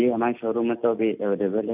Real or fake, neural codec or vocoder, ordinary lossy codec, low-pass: real; none; none; 3.6 kHz